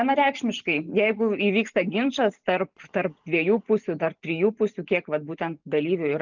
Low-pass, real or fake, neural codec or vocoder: 7.2 kHz; real; none